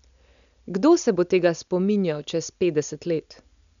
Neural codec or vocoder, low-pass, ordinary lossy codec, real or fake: none; 7.2 kHz; none; real